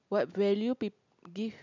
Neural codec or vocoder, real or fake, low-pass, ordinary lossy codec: none; real; 7.2 kHz; none